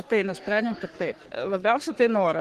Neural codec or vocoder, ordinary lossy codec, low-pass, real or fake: codec, 44.1 kHz, 3.4 kbps, Pupu-Codec; Opus, 16 kbps; 14.4 kHz; fake